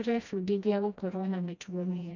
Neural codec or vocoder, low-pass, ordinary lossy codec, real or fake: codec, 16 kHz, 1 kbps, FreqCodec, smaller model; 7.2 kHz; none; fake